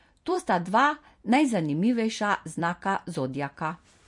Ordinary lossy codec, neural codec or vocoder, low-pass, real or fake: MP3, 48 kbps; vocoder, 44.1 kHz, 128 mel bands every 256 samples, BigVGAN v2; 10.8 kHz; fake